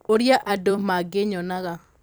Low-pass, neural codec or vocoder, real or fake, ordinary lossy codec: none; vocoder, 44.1 kHz, 128 mel bands every 256 samples, BigVGAN v2; fake; none